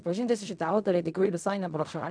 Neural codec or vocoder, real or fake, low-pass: codec, 16 kHz in and 24 kHz out, 0.4 kbps, LongCat-Audio-Codec, fine tuned four codebook decoder; fake; 9.9 kHz